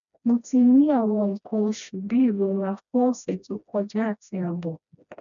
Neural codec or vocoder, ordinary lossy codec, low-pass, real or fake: codec, 16 kHz, 1 kbps, FreqCodec, smaller model; none; 7.2 kHz; fake